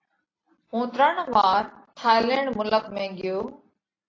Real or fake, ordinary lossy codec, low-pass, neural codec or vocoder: real; AAC, 32 kbps; 7.2 kHz; none